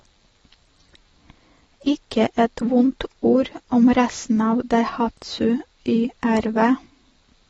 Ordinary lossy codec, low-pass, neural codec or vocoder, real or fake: AAC, 24 kbps; 19.8 kHz; none; real